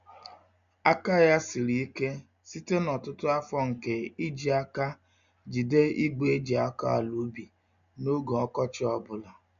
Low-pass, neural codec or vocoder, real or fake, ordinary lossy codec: 7.2 kHz; none; real; AAC, 96 kbps